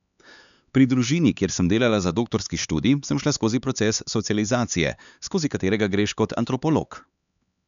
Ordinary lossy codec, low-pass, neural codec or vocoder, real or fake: none; 7.2 kHz; codec, 16 kHz, 4 kbps, X-Codec, HuBERT features, trained on LibriSpeech; fake